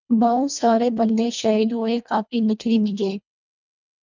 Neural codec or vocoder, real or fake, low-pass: codec, 24 kHz, 1.5 kbps, HILCodec; fake; 7.2 kHz